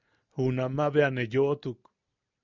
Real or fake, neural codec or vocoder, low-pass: real; none; 7.2 kHz